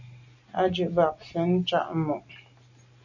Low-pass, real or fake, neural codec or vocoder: 7.2 kHz; real; none